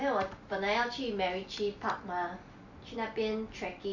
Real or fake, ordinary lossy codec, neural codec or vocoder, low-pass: real; none; none; 7.2 kHz